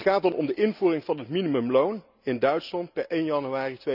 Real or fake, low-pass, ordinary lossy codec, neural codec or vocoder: real; 5.4 kHz; none; none